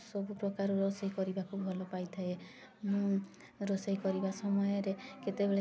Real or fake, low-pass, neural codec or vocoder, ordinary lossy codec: real; none; none; none